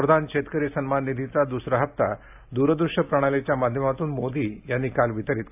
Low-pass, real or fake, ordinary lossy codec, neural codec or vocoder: 3.6 kHz; real; AAC, 32 kbps; none